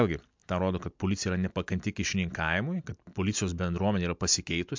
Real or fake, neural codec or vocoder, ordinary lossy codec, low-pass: real; none; MP3, 64 kbps; 7.2 kHz